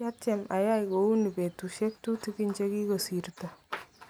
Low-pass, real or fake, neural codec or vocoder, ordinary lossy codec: none; real; none; none